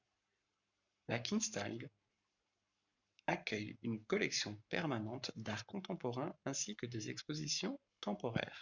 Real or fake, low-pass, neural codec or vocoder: fake; 7.2 kHz; codec, 44.1 kHz, 7.8 kbps, Pupu-Codec